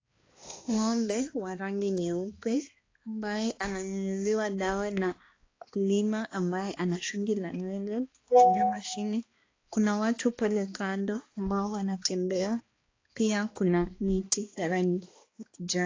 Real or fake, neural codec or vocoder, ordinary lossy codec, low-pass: fake; codec, 16 kHz, 2 kbps, X-Codec, HuBERT features, trained on balanced general audio; AAC, 32 kbps; 7.2 kHz